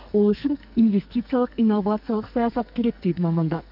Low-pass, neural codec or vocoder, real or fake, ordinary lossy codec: 5.4 kHz; codec, 44.1 kHz, 2.6 kbps, SNAC; fake; none